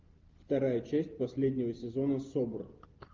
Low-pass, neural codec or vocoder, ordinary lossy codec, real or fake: 7.2 kHz; none; Opus, 32 kbps; real